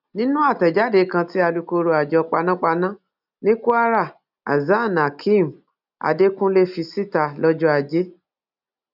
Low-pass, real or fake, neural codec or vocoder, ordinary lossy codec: 5.4 kHz; real; none; none